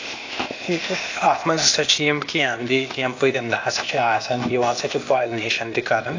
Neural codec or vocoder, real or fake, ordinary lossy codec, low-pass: codec, 16 kHz, 0.8 kbps, ZipCodec; fake; AAC, 48 kbps; 7.2 kHz